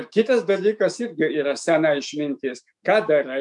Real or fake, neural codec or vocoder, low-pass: fake; autoencoder, 48 kHz, 128 numbers a frame, DAC-VAE, trained on Japanese speech; 10.8 kHz